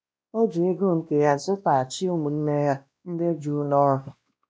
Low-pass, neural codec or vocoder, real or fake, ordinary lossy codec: none; codec, 16 kHz, 1 kbps, X-Codec, WavLM features, trained on Multilingual LibriSpeech; fake; none